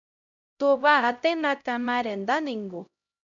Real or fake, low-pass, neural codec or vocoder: fake; 7.2 kHz; codec, 16 kHz, 0.5 kbps, X-Codec, HuBERT features, trained on LibriSpeech